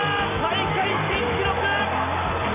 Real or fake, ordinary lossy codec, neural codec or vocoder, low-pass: real; none; none; 3.6 kHz